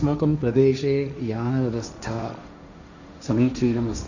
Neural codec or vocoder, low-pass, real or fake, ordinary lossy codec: codec, 16 kHz, 1.1 kbps, Voila-Tokenizer; 7.2 kHz; fake; none